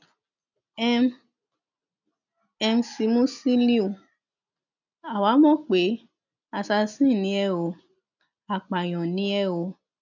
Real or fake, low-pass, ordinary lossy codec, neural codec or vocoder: real; 7.2 kHz; none; none